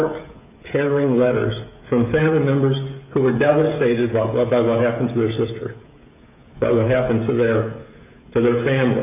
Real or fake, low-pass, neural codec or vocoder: fake; 3.6 kHz; codec, 16 kHz, 16 kbps, FreqCodec, smaller model